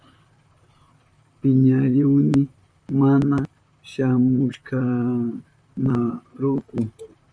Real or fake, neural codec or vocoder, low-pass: fake; vocoder, 44.1 kHz, 128 mel bands, Pupu-Vocoder; 9.9 kHz